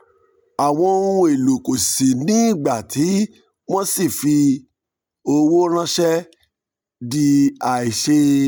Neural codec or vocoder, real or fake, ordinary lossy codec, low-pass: none; real; none; none